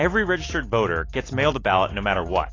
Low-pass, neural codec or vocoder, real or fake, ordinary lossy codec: 7.2 kHz; none; real; AAC, 32 kbps